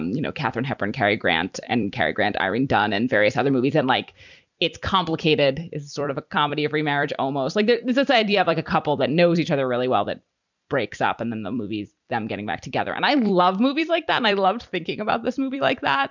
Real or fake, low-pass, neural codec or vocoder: fake; 7.2 kHz; vocoder, 44.1 kHz, 128 mel bands every 256 samples, BigVGAN v2